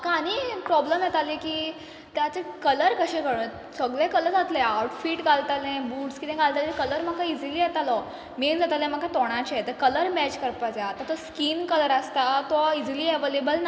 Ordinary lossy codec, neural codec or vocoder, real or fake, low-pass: none; none; real; none